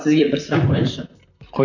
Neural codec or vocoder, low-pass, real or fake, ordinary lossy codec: vocoder, 44.1 kHz, 128 mel bands, Pupu-Vocoder; 7.2 kHz; fake; none